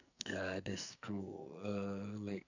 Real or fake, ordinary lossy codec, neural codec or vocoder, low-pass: fake; none; codec, 44.1 kHz, 2.6 kbps, SNAC; 7.2 kHz